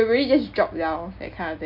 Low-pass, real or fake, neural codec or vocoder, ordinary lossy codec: 5.4 kHz; real; none; none